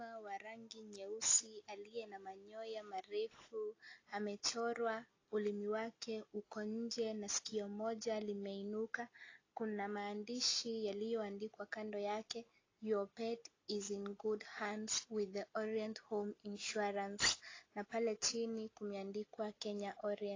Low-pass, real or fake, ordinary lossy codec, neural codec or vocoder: 7.2 kHz; real; AAC, 32 kbps; none